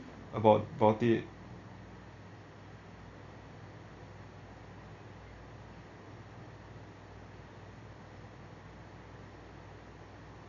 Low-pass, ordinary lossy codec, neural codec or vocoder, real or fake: 7.2 kHz; Opus, 64 kbps; none; real